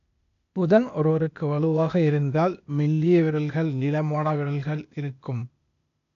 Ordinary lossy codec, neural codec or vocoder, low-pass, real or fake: none; codec, 16 kHz, 0.8 kbps, ZipCodec; 7.2 kHz; fake